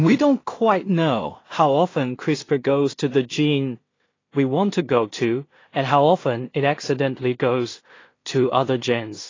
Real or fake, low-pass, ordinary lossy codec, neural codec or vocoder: fake; 7.2 kHz; AAC, 32 kbps; codec, 16 kHz in and 24 kHz out, 0.4 kbps, LongCat-Audio-Codec, two codebook decoder